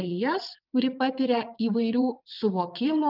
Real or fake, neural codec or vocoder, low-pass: fake; vocoder, 22.05 kHz, 80 mel bands, WaveNeXt; 5.4 kHz